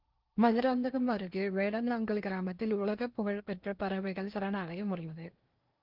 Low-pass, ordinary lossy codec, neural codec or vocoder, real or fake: 5.4 kHz; Opus, 24 kbps; codec, 16 kHz in and 24 kHz out, 0.8 kbps, FocalCodec, streaming, 65536 codes; fake